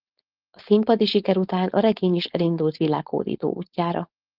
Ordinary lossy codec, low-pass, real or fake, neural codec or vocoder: Opus, 32 kbps; 5.4 kHz; fake; codec, 16 kHz, 4.8 kbps, FACodec